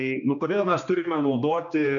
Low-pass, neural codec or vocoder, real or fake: 7.2 kHz; codec, 16 kHz, 2 kbps, X-Codec, HuBERT features, trained on general audio; fake